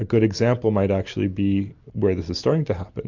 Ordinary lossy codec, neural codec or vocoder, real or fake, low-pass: MP3, 64 kbps; none; real; 7.2 kHz